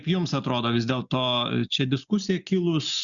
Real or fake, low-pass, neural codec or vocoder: real; 7.2 kHz; none